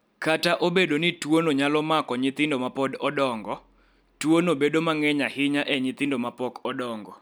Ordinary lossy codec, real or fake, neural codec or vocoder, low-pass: none; real; none; none